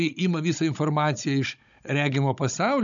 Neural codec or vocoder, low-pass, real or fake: codec, 16 kHz, 16 kbps, FunCodec, trained on Chinese and English, 50 frames a second; 7.2 kHz; fake